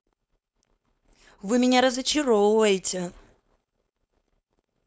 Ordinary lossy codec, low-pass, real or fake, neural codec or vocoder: none; none; fake; codec, 16 kHz, 4.8 kbps, FACodec